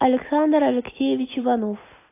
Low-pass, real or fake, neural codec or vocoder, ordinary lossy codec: 3.6 kHz; real; none; AAC, 24 kbps